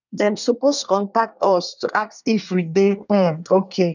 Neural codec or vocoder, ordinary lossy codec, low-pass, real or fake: codec, 24 kHz, 1 kbps, SNAC; none; 7.2 kHz; fake